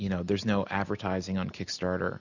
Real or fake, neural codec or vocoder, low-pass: real; none; 7.2 kHz